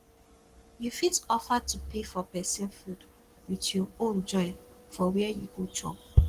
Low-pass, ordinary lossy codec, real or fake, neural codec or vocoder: 14.4 kHz; Opus, 24 kbps; fake; codec, 44.1 kHz, 7.8 kbps, Pupu-Codec